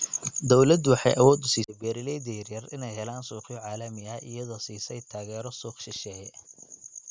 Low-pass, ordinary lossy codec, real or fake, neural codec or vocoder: none; none; real; none